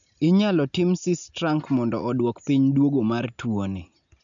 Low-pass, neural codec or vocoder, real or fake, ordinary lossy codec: 7.2 kHz; none; real; none